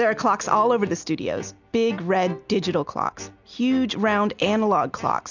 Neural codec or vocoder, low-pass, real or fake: none; 7.2 kHz; real